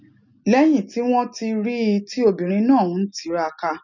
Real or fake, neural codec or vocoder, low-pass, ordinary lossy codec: real; none; 7.2 kHz; none